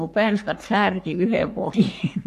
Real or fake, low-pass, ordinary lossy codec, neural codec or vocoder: fake; 14.4 kHz; AAC, 64 kbps; codec, 44.1 kHz, 3.4 kbps, Pupu-Codec